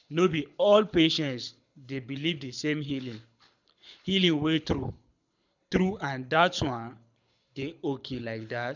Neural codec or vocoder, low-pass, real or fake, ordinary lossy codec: codec, 24 kHz, 6 kbps, HILCodec; 7.2 kHz; fake; none